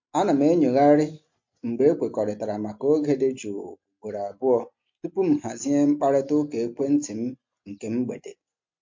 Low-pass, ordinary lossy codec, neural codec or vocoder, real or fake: 7.2 kHz; MP3, 48 kbps; none; real